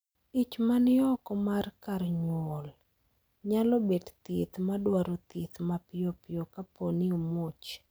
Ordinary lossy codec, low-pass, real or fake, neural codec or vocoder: none; none; real; none